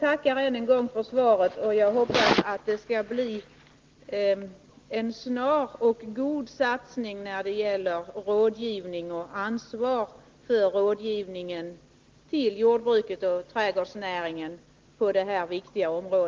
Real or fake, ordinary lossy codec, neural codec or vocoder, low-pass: real; Opus, 16 kbps; none; 7.2 kHz